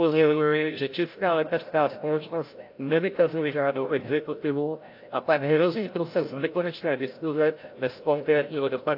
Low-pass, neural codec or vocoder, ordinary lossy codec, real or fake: 5.4 kHz; codec, 16 kHz, 0.5 kbps, FreqCodec, larger model; AAC, 32 kbps; fake